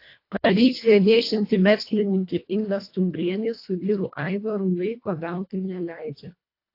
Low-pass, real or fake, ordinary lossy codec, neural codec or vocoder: 5.4 kHz; fake; AAC, 32 kbps; codec, 24 kHz, 1.5 kbps, HILCodec